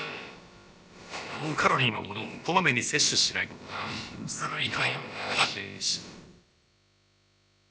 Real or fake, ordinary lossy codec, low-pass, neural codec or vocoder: fake; none; none; codec, 16 kHz, about 1 kbps, DyCAST, with the encoder's durations